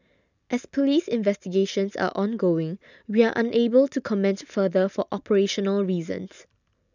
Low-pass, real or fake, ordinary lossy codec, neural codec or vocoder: 7.2 kHz; real; none; none